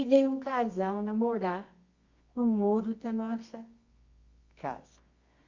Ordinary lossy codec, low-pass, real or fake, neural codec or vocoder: Opus, 64 kbps; 7.2 kHz; fake; codec, 24 kHz, 0.9 kbps, WavTokenizer, medium music audio release